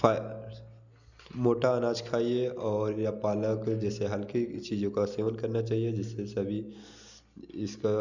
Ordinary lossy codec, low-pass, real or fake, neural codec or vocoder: none; 7.2 kHz; real; none